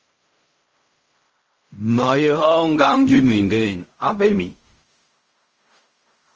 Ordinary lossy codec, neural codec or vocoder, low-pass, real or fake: Opus, 24 kbps; codec, 16 kHz in and 24 kHz out, 0.4 kbps, LongCat-Audio-Codec, fine tuned four codebook decoder; 7.2 kHz; fake